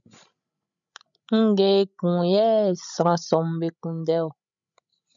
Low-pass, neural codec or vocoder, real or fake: 7.2 kHz; codec, 16 kHz, 16 kbps, FreqCodec, larger model; fake